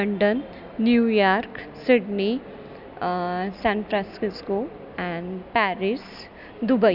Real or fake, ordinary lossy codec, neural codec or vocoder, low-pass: real; none; none; 5.4 kHz